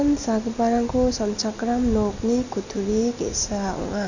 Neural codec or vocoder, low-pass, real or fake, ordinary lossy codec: none; 7.2 kHz; real; none